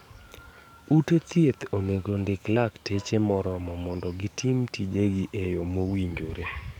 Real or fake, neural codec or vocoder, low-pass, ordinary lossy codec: fake; codec, 44.1 kHz, 7.8 kbps, DAC; 19.8 kHz; none